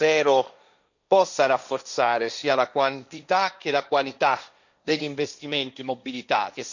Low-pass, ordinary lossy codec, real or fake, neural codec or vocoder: 7.2 kHz; none; fake; codec, 16 kHz, 1.1 kbps, Voila-Tokenizer